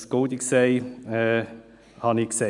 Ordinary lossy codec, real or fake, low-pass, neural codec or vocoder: none; real; 10.8 kHz; none